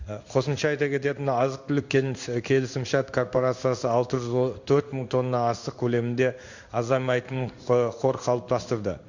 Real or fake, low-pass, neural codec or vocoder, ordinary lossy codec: fake; 7.2 kHz; codec, 16 kHz in and 24 kHz out, 1 kbps, XY-Tokenizer; Opus, 64 kbps